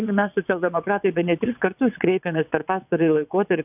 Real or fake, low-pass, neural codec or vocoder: fake; 3.6 kHz; vocoder, 22.05 kHz, 80 mel bands, WaveNeXt